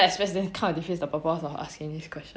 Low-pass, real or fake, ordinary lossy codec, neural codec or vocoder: none; real; none; none